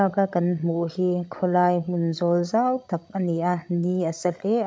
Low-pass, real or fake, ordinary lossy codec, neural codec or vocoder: none; fake; none; codec, 16 kHz, 16 kbps, FreqCodec, larger model